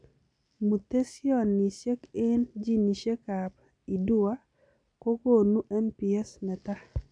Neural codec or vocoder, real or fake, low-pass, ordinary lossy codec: none; real; 9.9 kHz; none